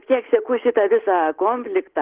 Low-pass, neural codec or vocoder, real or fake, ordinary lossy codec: 3.6 kHz; none; real; Opus, 16 kbps